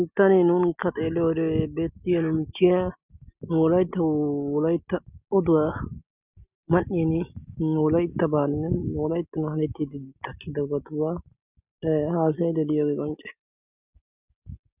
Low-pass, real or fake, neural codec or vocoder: 3.6 kHz; real; none